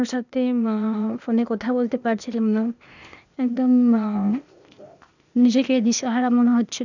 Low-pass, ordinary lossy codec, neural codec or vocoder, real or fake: 7.2 kHz; none; codec, 16 kHz, 0.8 kbps, ZipCodec; fake